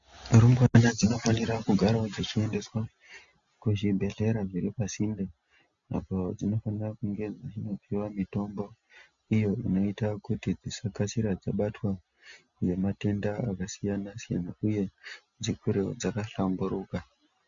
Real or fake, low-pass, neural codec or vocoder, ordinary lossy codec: real; 7.2 kHz; none; MP3, 64 kbps